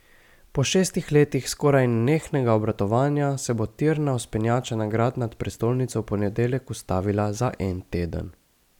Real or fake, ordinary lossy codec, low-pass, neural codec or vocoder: real; none; 19.8 kHz; none